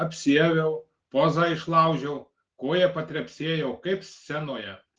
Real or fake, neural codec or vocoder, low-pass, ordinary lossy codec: real; none; 7.2 kHz; Opus, 16 kbps